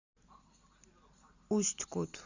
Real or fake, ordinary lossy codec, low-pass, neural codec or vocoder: real; Opus, 64 kbps; 7.2 kHz; none